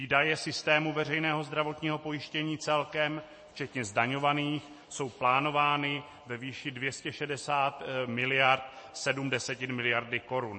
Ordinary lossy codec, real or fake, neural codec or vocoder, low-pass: MP3, 32 kbps; real; none; 9.9 kHz